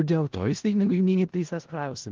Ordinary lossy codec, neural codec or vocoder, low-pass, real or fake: Opus, 16 kbps; codec, 16 kHz in and 24 kHz out, 0.4 kbps, LongCat-Audio-Codec, four codebook decoder; 7.2 kHz; fake